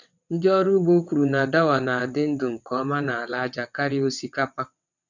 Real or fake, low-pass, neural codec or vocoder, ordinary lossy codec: fake; 7.2 kHz; vocoder, 22.05 kHz, 80 mel bands, WaveNeXt; none